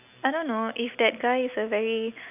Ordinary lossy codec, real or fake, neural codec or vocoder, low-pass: none; real; none; 3.6 kHz